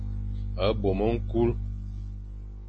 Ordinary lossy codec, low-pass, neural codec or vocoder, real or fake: MP3, 32 kbps; 10.8 kHz; none; real